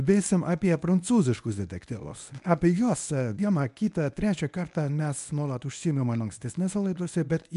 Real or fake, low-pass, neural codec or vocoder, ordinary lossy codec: fake; 10.8 kHz; codec, 24 kHz, 0.9 kbps, WavTokenizer, medium speech release version 1; MP3, 96 kbps